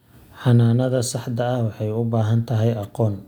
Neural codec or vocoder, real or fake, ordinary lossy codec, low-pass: none; real; none; 19.8 kHz